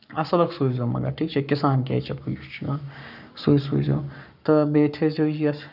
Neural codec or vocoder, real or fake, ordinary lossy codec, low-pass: codec, 44.1 kHz, 7.8 kbps, Pupu-Codec; fake; none; 5.4 kHz